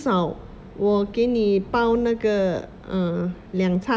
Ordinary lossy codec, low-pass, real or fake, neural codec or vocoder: none; none; real; none